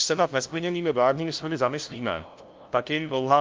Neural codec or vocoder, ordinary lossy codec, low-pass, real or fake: codec, 16 kHz, 0.5 kbps, FunCodec, trained on LibriTTS, 25 frames a second; Opus, 24 kbps; 7.2 kHz; fake